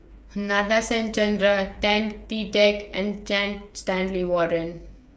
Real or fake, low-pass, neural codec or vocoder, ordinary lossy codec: fake; none; codec, 16 kHz, 8 kbps, FreqCodec, smaller model; none